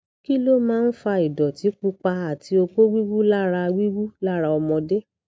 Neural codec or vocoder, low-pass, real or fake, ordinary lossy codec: none; none; real; none